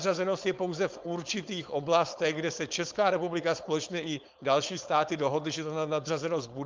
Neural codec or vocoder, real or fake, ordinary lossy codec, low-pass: codec, 16 kHz, 4.8 kbps, FACodec; fake; Opus, 24 kbps; 7.2 kHz